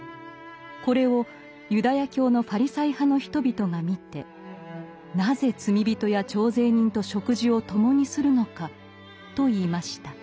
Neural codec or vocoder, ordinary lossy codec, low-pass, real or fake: none; none; none; real